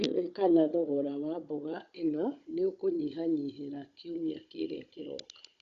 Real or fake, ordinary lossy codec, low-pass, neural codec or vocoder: fake; Opus, 64 kbps; 7.2 kHz; codec, 16 kHz, 16 kbps, FunCodec, trained on Chinese and English, 50 frames a second